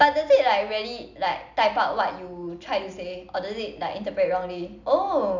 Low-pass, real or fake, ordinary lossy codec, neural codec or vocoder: 7.2 kHz; real; none; none